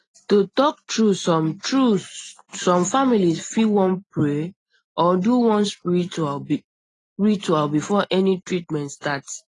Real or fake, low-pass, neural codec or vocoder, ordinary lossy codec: real; 10.8 kHz; none; AAC, 32 kbps